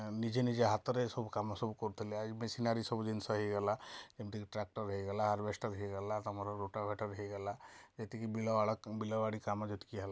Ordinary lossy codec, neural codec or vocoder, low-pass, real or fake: none; none; none; real